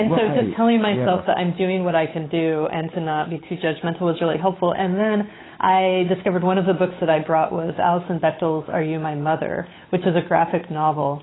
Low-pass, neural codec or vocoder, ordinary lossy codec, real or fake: 7.2 kHz; codec, 16 kHz, 8 kbps, FunCodec, trained on Chinese and English, 25 frames a second; AAC, 16 kbps; fake